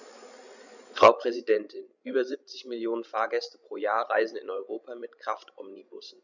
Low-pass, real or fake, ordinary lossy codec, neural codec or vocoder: 7.2 kHz; fake; none; codec, 16 kHz, 16 kbps, FreqCodec, larger model